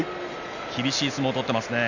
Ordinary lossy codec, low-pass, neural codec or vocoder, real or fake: none; 7.2 kHz; none; real